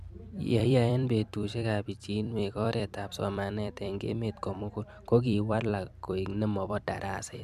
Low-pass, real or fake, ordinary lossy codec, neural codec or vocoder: 14.4 kHz; fake; MP3, 96 kbps; vocoder, 44.1 kHz, 128 mel bands every 256 samples, BigVGAN v2